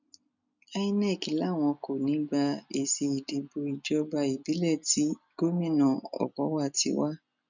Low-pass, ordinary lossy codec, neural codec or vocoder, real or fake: 7.2 kHz; none; none; real